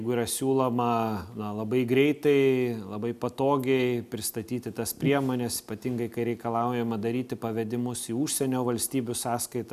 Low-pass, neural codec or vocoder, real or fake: 14.4 kHz; none; real